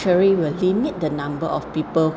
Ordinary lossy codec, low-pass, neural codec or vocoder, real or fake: none; none; none; real